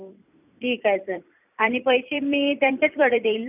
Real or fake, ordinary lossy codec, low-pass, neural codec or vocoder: fake; none; 3.6 kHz; vocoder, 44.1 kHz, 128 mel bands every 256 samples, BigVGAN v2